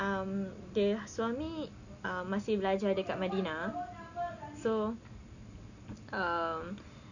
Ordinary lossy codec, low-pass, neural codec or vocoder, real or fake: none; 7.2 kHz; none; real